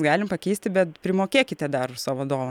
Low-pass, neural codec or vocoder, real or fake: 19.8 kHz; none; real